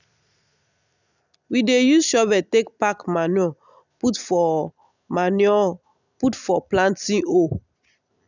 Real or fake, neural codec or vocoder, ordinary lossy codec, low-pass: real; none; none; 7.2 kHz